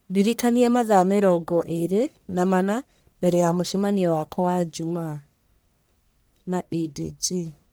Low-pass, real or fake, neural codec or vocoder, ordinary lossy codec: none; fake; codec, 44.1 kHz, 1.7 kbps, Pupu-Codec; none